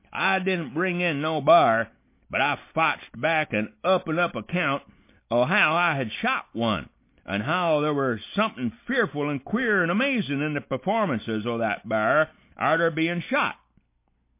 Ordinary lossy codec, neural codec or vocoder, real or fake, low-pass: MP3, 24 kbps; none; real; 3.6 kHz